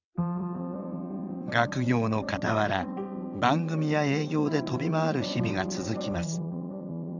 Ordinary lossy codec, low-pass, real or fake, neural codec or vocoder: none; 7.2 kHz; fake; vocoder, 22.05 kHz, 80 mel bands, WaveNeXt